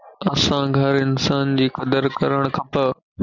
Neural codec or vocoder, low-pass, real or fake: none; 7.2 kHz; real